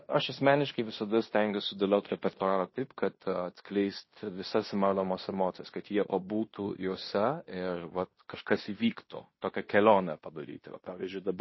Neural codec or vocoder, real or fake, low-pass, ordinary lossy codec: codec, 16 kHz in and 24 kHz out, 0.9 kbps, LongCat-Audio-Codec, fine tuned four codebook decoder; fake; 7.2 kHz; MP3, 24 kbps